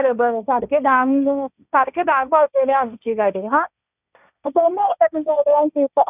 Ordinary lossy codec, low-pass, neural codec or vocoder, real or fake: none; 3.6 kHz; codec, 16 kHz, 1.1 kbps, Voila-Tokenizer; fake